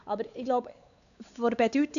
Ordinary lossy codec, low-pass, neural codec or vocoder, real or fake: none; 7.2 kHz; codec, 16 kHz, 4 kbps, X-Codec, WavLM features, trained on Multilingual LibriSpeech; fake